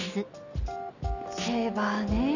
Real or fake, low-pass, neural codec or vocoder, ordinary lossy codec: real; 7.2 kHz; none; none